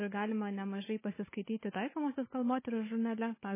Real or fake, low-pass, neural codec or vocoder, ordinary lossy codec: fake; 3.6 kHz; codec, 16 kHz, 4 kbps, FunCodec, trained on Chinese and English, 50 frames a second; MP3, 16 kbps